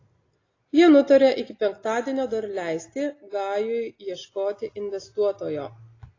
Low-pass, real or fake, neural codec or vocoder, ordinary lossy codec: 7.2 kHz; real; none; AAC, 32 kbps